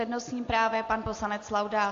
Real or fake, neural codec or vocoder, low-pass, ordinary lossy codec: real; none; 7.2 kHz; AAC, 48 kbps